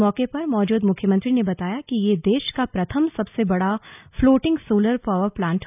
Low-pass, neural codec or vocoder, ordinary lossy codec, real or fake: 3.6 kHz; none; none; real